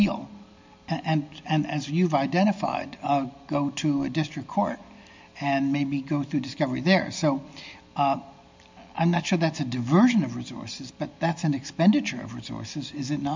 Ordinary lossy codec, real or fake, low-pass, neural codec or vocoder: MP3, 64 kbps; real; 7.2 kHz; none